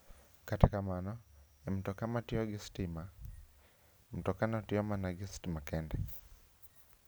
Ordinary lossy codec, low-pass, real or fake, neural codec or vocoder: none; none; real; none